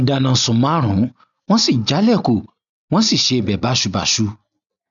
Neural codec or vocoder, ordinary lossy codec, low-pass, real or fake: none; none; 7.2 kHz; real